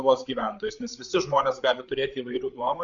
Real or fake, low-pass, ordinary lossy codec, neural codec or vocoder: fake; 7.2 kHz; Opus, 64 kbps; codec, 16 kHz, 8 kbps, FreqCodec, larger model